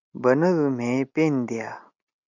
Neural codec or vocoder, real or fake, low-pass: none; real; 7.2 kHz